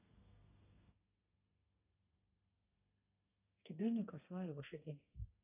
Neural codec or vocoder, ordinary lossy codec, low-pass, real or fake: codec, 32 kHz, 1.9 kbps, SNAC; AAC, 32 kbps; 3.6 kHz; fake